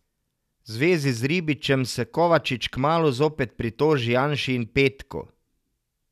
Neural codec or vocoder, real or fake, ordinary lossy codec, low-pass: none; real; none; 14.4 kHz